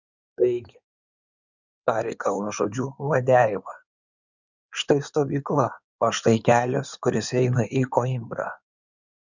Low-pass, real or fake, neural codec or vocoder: 7.2 kHz; fake; codec, 16 kHz in and 24 kHz out, 2.2 kbps, FireRedTTS-2 codec